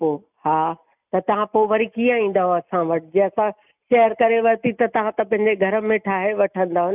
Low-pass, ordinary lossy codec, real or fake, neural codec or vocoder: 3.6 kHz; none; real; none